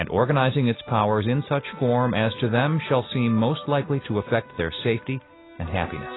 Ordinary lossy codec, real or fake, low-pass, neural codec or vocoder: AAC, 16 kbps; real; 7.2 kHz; none